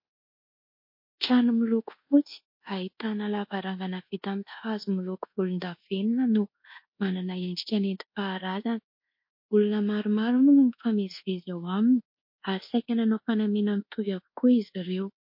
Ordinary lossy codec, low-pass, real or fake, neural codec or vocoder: MP3, 32 kbps; 5.4 kHz; fake; codec, 24 kHz, 1.2 kbps, DualCodec